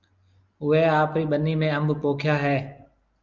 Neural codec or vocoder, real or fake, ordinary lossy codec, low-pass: none; real; Opus, 32 kbps; 7.2 kHz